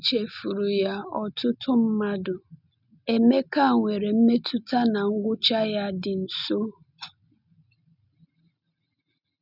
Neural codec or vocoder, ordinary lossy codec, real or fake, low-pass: none; none; real; 5.4 kHz